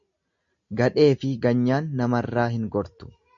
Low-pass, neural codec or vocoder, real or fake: 7.2 kHz; none; real